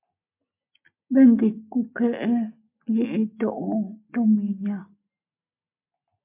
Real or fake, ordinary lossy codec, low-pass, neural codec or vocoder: real; MP3, 32 kbps; 3.6 kHz; none